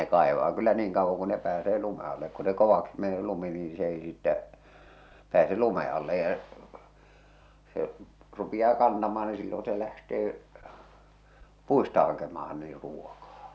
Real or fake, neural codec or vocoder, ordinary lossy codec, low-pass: real; none; none; none